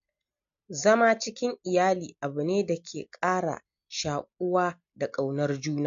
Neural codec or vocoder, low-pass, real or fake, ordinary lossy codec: none; 7.2 kHz; real; MP3, 48 kbps